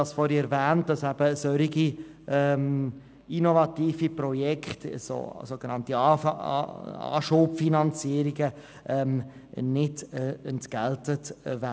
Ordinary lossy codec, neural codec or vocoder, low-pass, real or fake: none; none; none; real